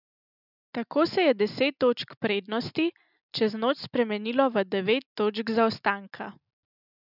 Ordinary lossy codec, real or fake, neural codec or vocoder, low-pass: none; real; none; 5.4 kHz